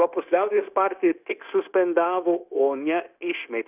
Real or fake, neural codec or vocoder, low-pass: fake; codec, 16 kHz, 0.9 kbps, LongCat-Audio-Codec; 3.6 kHz